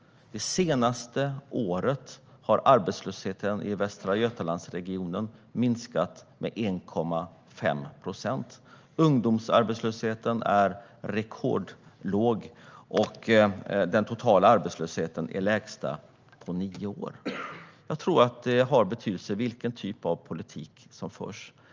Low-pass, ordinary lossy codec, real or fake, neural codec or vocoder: 7.2 kHz; Opus, 24 kbps; real; none